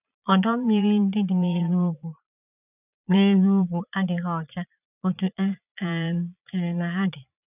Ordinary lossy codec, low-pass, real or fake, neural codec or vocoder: none; 3.6 kHz; fake; vocoder, 22.05 kHz, 80 mel bands, Vocos